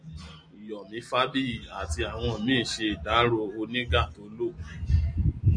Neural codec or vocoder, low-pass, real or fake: none; 9.9 kHz; real